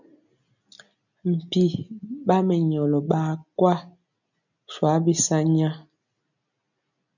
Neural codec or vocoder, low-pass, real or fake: none; 7.2 kHz; real